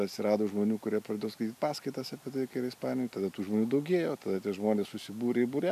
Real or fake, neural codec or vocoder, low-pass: real; none; 14.4 kHz